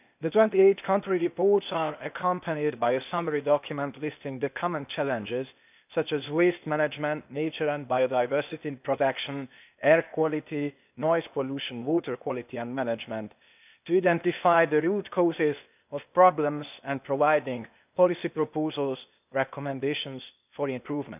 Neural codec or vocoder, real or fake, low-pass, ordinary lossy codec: codec, 16 kHz, 0.8 kbps, ZipCodec; fake; 3.6 kHz; none